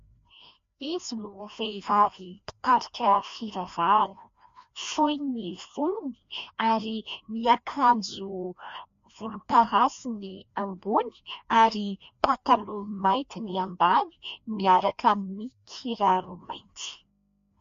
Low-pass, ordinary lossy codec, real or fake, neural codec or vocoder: 7.2 kHz; MP3, 48 kbps; fake; codec, 16 kHz, 1 kbps, FreqCodec, larger model